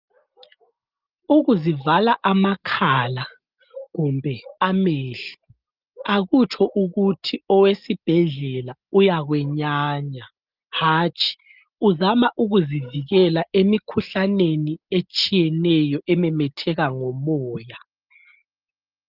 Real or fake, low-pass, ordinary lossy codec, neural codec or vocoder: real; 5.4 kHz; Opus, 24 kbps; none